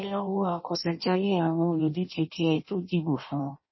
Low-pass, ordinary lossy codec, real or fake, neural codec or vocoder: 7.2 kHz; MP3, 24 kbps; fake; codec, 16 kHz in and 24 kHz out, 0.6 kbps, FireRedTTS-2 codec